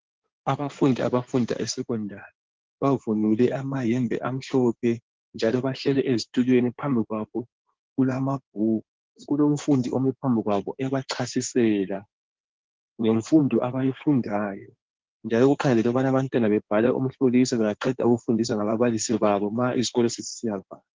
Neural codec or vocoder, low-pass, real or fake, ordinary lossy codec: codec, 16 kHz in and 24 kHz out, 2.2 kbps, FireRedTTS-2 codec; 7.2 kHz; fake; Opus, 16 kbps